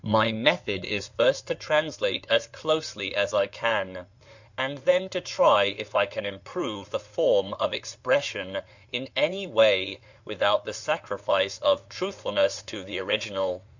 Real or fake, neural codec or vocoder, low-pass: fake; codec, 16 kHz in and 24 kHz out, 2.2 kbps, FireRedTTS-2 codec; 7.2 kHz